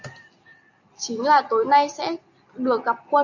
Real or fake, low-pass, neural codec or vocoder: real; 7.2 kHz; none